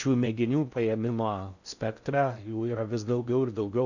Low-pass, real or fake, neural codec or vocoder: 7.2 kHz; fake; codec, 16 kHz in and 24 kHz out, 0.6 kbps, FocalCodec, streaming, 4096 codes